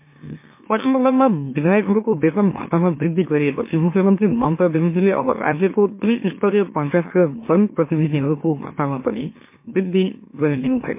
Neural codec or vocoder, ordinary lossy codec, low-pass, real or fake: autoencoder, 44.1 kHz, a latent of 192 numbers a frame, MeloTTS; MP3, 24 kbps; 3.6 kHz; fake